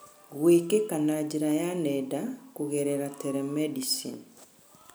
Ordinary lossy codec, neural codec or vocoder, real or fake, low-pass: none; none; real; none